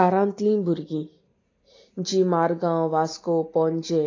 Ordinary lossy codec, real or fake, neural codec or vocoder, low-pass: AAC, 32 kbps; real; none; 7.2 kHz